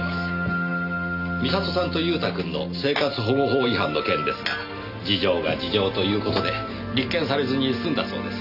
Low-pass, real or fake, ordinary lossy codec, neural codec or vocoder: 5.4 kHz; real; none; none